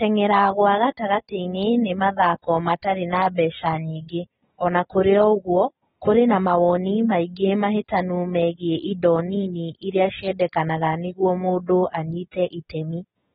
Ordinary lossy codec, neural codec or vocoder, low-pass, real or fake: AAC, 16 kbps; none; 19.8 kHz; real